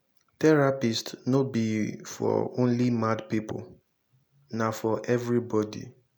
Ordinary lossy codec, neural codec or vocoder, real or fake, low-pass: none; none; real; 19.8 kHz